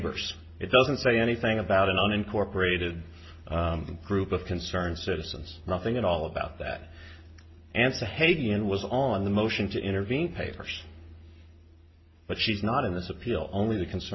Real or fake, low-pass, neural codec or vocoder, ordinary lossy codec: real; 7.2 kHz; none; MP3, 24 kbps